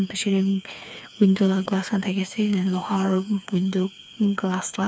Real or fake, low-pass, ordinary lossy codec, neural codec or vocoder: fake; none; none; codec, 16 kHz, 4 kbps, FreqCodec, smaller model